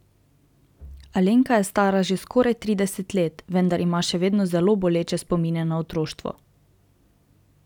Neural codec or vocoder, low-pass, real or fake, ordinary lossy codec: none; 19.8 kHz; real; none